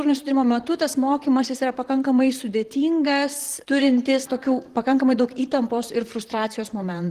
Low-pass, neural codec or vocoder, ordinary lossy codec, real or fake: 14.4 kHz; vocoder, 44.1 kHz, 128 mel bands, Pupu-Vocoder; Opus, 16 kbps; fake